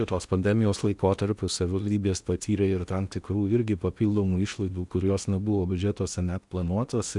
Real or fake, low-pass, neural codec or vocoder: fake; 10.8 kHz; codec, 16 kHz in and 24 kHz out, 0.8 kbps, FocalCodec, streaming, 65536 codes